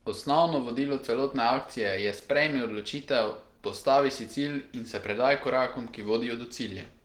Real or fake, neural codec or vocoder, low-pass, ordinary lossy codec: real; none; 19.8 kHz; Opus, 16 kbps